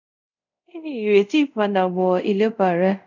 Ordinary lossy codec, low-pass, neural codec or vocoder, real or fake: none; 7.2 kHz; codec, 24 kHz, 0.5 kbps, DualCodec; fake